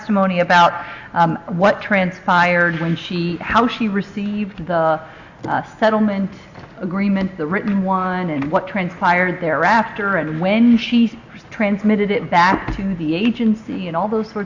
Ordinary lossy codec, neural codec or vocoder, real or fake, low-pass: Opus, 64 kbps; none; real; 7.2 kHz